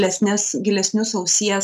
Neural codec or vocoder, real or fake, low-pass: none; real; 14.4 kHz